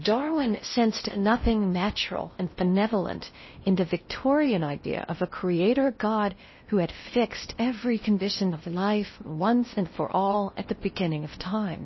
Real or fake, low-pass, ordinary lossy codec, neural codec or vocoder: fake; 7.2 kHz; MP3, 24 kbps; codec, 16 kHz in and 24 kHz out, 0.6 kbps, FocalCodec, streaming, 4096 codes